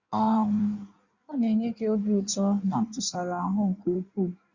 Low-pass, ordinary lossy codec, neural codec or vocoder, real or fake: 7.2 kHz; Opus, 64 kbps; codec, 16 kHz in and 24 kHz out, 1.1 kbps, FireRedTTS-2 codec; fake